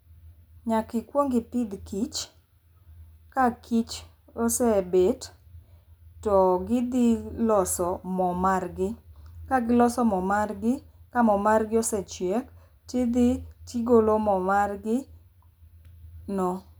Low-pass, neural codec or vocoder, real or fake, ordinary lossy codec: none; none; real; none